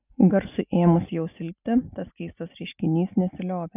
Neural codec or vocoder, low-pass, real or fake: none; 3.6 kHz; real